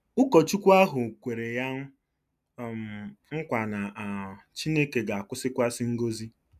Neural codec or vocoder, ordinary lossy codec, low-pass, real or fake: none; none; 14.4 kHz; real